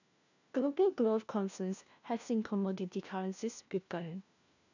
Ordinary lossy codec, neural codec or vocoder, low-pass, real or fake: none; codec, 16 kHz, 1 kbps, FunCodec, trained on LibriTTS, 50 frames a second; 7.2 kHz; fake